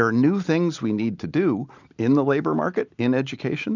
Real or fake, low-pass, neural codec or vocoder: real; 7.2 kHz; none